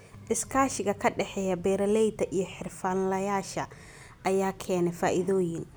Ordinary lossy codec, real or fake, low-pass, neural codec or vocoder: none; real; none; none